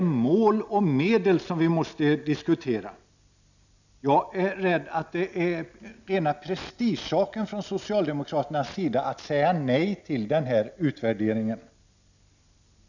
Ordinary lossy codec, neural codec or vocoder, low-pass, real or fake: none; none; 7.2 kHz; real